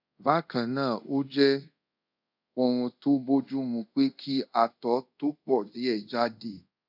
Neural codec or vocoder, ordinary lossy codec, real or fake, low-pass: codec, 24 kHz, 0.5 kbps, DualCodec; none; fake; 5.4 kHz